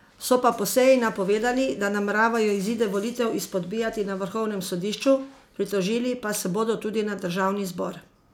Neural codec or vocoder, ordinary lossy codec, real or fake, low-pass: none; none; real; 19.8 kHz